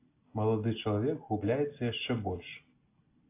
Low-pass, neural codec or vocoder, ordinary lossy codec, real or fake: 3.6 kHz; none; AAC, 24 kbps; real